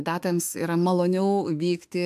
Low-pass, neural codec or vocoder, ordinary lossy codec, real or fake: 14.4 kHz; autoencoder, 48 kHz, 32 numbers a frame, DAC-VAE, trained on Japanese speech; AAC, 96 kbps; fake